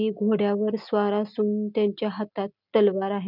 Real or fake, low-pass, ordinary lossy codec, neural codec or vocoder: real; 5.4 kHz; none; none